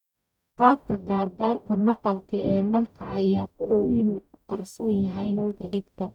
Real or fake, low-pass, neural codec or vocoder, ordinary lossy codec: fake; 19.8 kHz; codec, 44.1 kHz, 0.9 kbps, DAC; none